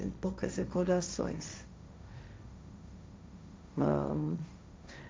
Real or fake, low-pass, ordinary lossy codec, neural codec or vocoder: fake; none; none; codec, 16 kHz, 1.1 kbps, Voila-Tokenizer